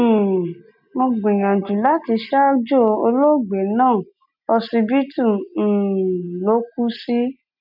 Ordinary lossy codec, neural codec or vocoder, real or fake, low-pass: none; none; real; 5.4 kHz